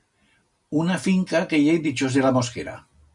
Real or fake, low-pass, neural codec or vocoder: real; 10.8 kHz; none